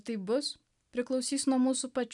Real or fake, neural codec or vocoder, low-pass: real; none; 10.8 kHz